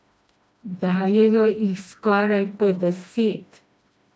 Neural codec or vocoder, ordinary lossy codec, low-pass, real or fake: codec, 16 kHz, 1 kbps, FreqCodec, smaller model; none; none; fake